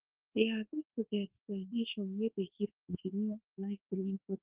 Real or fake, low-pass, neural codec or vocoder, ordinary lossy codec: fake; 3.6 kHz; codec, 24 kHz, 1.2 kbps, DualCodec; Opus, 16 kbps